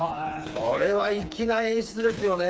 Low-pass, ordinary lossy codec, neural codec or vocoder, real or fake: none; none; codec, 16 kHz, 4 kbps, FreqCodec, smaller model; fake